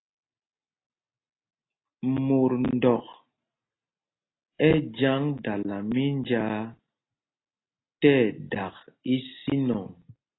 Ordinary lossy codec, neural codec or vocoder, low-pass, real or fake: AAC, 16 kbps; none; 7.2 kHz; real